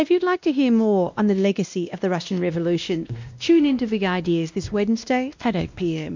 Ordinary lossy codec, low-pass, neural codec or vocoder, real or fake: MP3, 64 kbps; 7.2 kHz; codec, 16 kHz, 1 kbps, X-Codec, WavLM features, trained on Multilingual LibriSpeech; fake